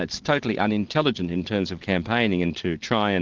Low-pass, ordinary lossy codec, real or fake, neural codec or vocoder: 7.2 kHz; Opus, 16 kbps; real; none